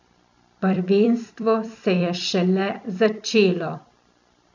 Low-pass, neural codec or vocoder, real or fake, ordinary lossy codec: 7.2 kHz; vocoder, 44.1 kHz, 128 mel bands every 256 samples, BigVGAN v2; fake; none